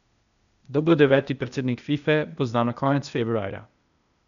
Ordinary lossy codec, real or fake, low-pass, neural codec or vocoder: none; fake; 7.2 kHz; codec, 16 kHz, 0.8 kbps, ZipCodec